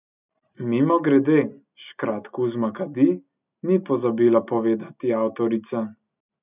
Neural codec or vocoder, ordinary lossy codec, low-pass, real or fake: none; none; 3.6 kHz; real